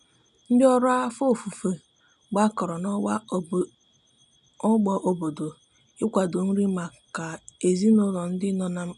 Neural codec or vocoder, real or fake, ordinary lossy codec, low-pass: none; real; none; 10.8 kHz